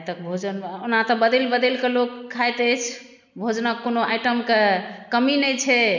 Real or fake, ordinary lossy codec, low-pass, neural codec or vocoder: real; none; 7.2 kHz; none